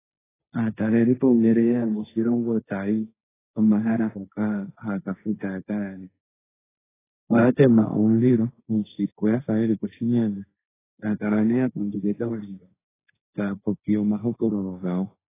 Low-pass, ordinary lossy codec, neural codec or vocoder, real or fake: 3.6 kHz; AAC, 16 kbps; codec, 16 kHz, 1.1 kbps, Voila-Tokenizer; fake